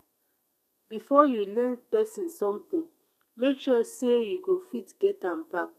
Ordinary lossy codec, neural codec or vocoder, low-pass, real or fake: none; codec, 32 kHz, 1.9 kbps, SNAC; 14.4 kHz; fake